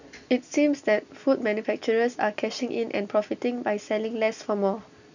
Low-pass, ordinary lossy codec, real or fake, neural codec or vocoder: 7.2 kHz; none; real; none